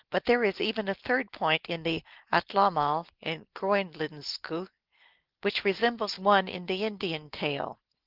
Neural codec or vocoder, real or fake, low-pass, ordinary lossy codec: none; real; 5.4 kHz; Opus, 16 kbps